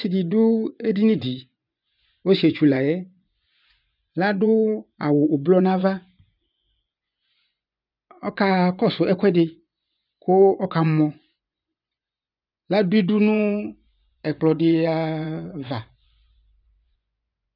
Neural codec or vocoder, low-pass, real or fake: vocoder, 24 kHz, 100 mel bands, Vocos; 5.4 kHz; fake